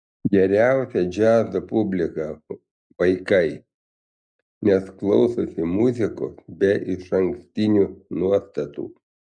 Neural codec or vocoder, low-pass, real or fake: none; 9.9 kHz; real